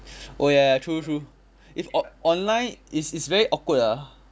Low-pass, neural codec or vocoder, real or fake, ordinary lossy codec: none; none; real; none